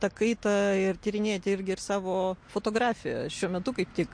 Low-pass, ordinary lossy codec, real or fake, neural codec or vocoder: 9.9 kHz; MP3, 48 kbps; real; none